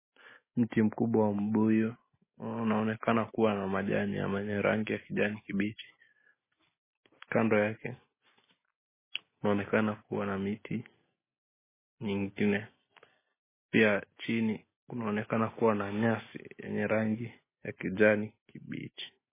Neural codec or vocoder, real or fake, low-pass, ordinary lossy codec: none; real; 3.6 kHz; MP3, 16 kbps